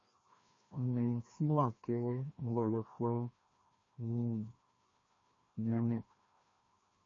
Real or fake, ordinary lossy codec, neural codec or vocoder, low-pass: fake; MP3, 32 kbps; codec, 16 kHz, 1 kbps, FreqCodec, larger model; 7.2 kHz